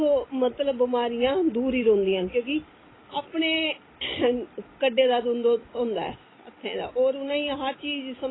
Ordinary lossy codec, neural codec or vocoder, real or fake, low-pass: AAC, 16 kbps; none; real; 7.2 kHz